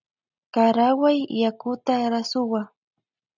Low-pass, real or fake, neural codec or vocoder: 7.2 kHz; real; none